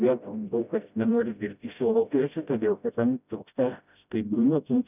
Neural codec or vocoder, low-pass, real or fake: codec, 16 kHz, 0.5 kbps, FreqCodec, smaller model; 3.6 kHz; fake